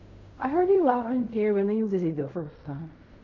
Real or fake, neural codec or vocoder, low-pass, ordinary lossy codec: fake; codec, 16 kHz in and 24 kHz out, 0.4 kbps, LongCat-Audio-Codec, fine tuned four codebook decoder; 7.2 kHz; MP3, 48 kbps